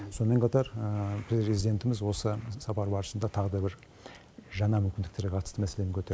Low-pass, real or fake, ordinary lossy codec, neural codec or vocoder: none; real; none; none